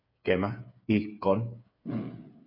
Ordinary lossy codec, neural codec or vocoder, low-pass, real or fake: AAC, 48 kbps; codec, 16 kHz, 8 kbps, FreqCodec, smaller model; 5.4 kHz; fake